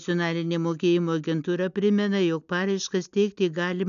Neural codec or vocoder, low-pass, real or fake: none; 7.2 kHz; real